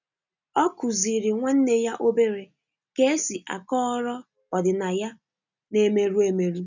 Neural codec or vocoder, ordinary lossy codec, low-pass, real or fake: none; none; 7.2 kHz; real